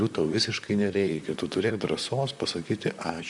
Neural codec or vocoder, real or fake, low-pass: vocoder, 44.1 kHz, 128 mel bands, Pupu-Vocoder; fake; 10.8 kHz